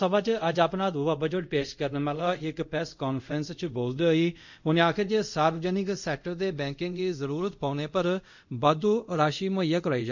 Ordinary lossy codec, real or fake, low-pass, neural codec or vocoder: none; fake; 7.2 kHz; codec, 24 kHz, 0.5 kbps, DualCodec